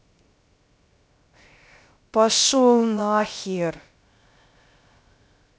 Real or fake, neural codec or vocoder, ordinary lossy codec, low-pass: fake; codec, 16 kHz, 0.3 kbps, FocalCodec; none; none